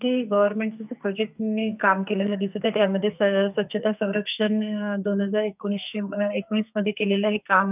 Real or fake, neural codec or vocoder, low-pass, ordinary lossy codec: fake; codec, 44.1 kHz, 2.6 kbps, SNAC; 3.6 kHz; none